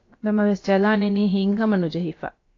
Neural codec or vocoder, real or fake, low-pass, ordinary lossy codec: codec, 16 kHz, about 1 kbps, DyCAST, with the encoder's durations; fake; 7.2 kHz; AAC, 32 kbps